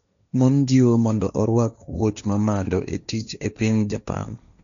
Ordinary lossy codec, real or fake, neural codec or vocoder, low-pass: none; fake; codec, 16 kHz, 1.1 kbps, Voila-Tokenizer; 7.2 kHz